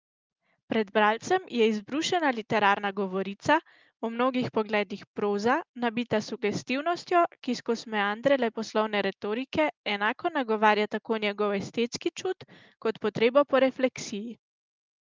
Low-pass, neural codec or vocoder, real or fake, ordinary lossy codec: 7.2 kHz; none; real; Opus, 24 kbps